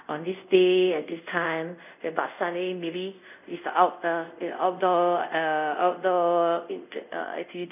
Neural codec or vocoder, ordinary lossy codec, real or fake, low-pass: codec, 24 kHz, 0.5 kbps, DualCodec; none; fake; 3.6 kHz